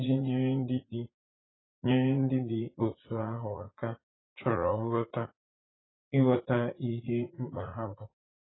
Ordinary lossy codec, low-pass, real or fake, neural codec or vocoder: AAC, 16 kbps; 7.2 kHz; fake; vocoder, 44.1 kHz, 80 mel bands, Vocos